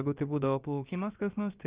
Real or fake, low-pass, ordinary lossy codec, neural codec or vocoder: fake; 3.6 kHz; Opus, 64 kbps; codec, 16 kHz, about 1 kbps, DyCAST, with the encoder's durations